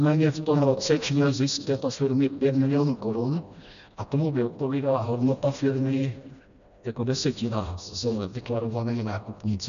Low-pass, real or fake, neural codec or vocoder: 7.2 kHz; fake; codec, 16 kHz, 1 kbps, FreqCodec, smaller model